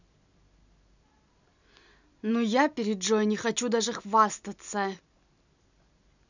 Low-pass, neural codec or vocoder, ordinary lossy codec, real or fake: 7.2 kHz; none; none; real